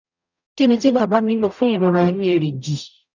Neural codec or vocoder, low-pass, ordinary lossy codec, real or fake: codec, 44.1 kHz, 0.9 kbps, DAC; 7.2 kHz; none; fake